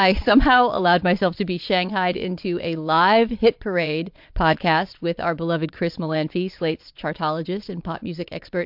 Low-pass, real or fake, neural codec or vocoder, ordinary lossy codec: 5.4 kHz; fake; vocoder, 22.05 kHz, 80 mel bands, Vocos; MP3, 48 kbps